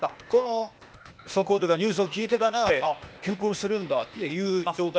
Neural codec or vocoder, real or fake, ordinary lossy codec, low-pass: codec, 16 kHz, 0.8 kbps, ZipCodec; fake; none; none